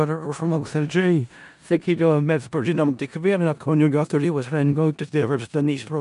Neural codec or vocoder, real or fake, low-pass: codec, 16 kHz in and 24 kHz out, 0.4 kbps, LongCat-Audio-Codec, four codebook decoder; fake; 10.8 kHz